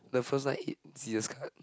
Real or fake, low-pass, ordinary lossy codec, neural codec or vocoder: real; none; none; none